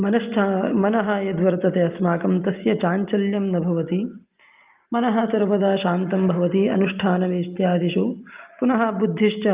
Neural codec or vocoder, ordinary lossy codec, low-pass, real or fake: none; Opus, 32 kbps; 3.6 kHz; real